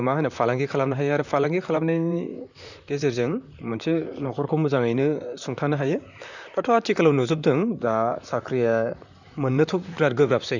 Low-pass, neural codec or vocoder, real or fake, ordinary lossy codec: 7.2 kHz; vocoder, 44.1 kHz, 128 mel bands, Pupu-Vocoder; fake; none